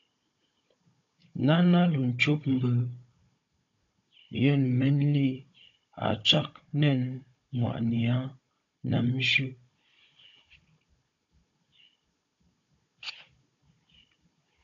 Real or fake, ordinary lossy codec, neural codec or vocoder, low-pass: fake; AAC, 64 kbps; codec, 16 kHz, 16 kbps, FunCodec, trained on Chinese and English, 50 frames a second; 7.2 kHz